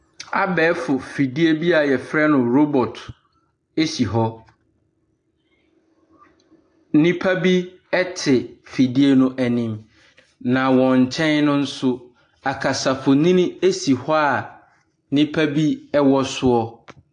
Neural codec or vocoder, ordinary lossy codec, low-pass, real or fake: none; AAC, 48 kbps; 9.9 kHz; real